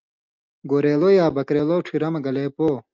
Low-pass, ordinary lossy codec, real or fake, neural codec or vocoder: 7.2 kHz; Opus, 24 kbps; real; none